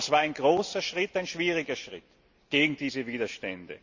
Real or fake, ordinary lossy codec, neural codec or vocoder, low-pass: real; Opus, 64 kbps; none; 7.2 kHz